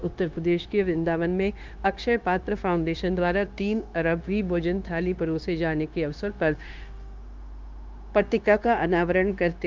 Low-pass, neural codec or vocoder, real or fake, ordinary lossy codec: 7.2 kHz; codec, 16 kHz, 0.9 kbps, LongCat-Audio-Codec; fake; Opus, 24 kbps